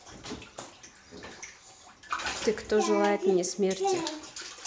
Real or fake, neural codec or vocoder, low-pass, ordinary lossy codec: real; none; none; none